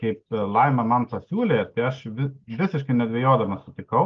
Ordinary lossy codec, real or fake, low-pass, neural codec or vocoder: Opus, 32 kbps; real; 7.2 kHz; none